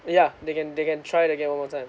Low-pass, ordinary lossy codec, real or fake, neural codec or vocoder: none; none; real; none